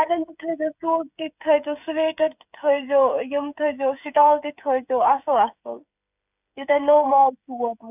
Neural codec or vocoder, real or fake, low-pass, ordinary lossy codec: codec, 16 kHz, 8 kbps, FreqCodec, smaller model; fake; 3.6 kHz; none